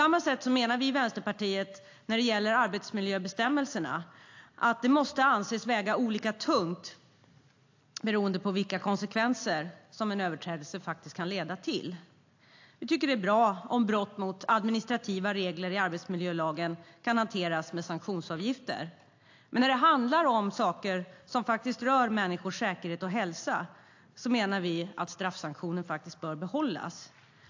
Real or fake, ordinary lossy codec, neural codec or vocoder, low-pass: real; AAC, 48 kbps; none; 7.2 kHz